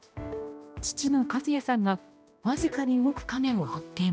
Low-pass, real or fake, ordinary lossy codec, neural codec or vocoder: none; fake; none; codec, 16 kHz, 1 kbps, X-Codec, HuBERT features, trained on balanced general audio